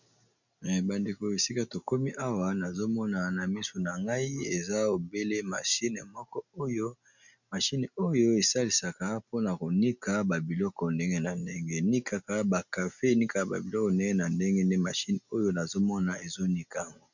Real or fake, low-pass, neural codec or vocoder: real; 7.2 kHz; none